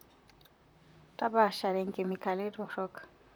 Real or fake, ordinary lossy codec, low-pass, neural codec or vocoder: fake; none; none; vocoder, 44.1 kHz, 128 mel bands, Pupu-Vocoder